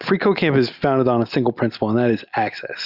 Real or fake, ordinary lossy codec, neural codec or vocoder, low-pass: real; Opus, 64 kbps; none; 5.4 kHz